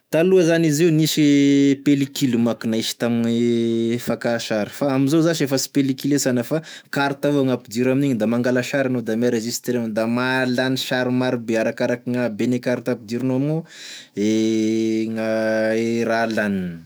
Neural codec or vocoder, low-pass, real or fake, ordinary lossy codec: autoencoder, 48 kHz, 128 numbers a frame, DAC-VAE, trained on Japanese speech; none; fake; none